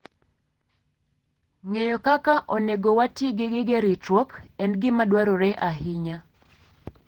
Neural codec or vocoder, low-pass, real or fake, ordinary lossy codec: vocoder, 48 kHz, 128 mel bands, Vocos; 19.8 kHz; fake; Opus, 16 kbps